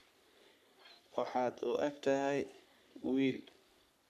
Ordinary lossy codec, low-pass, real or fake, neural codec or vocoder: none; 14.4 kHz; fake; codec, 44.1 kHz, 3.4 kbps, Pupu-Codec